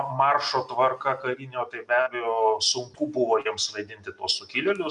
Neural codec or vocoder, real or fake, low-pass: none; real; 10.8 kHz